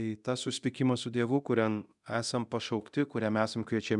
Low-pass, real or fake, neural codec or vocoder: 10.8 kHz; fake; codec, 24 kHz, 0.9 kbps, DualCodec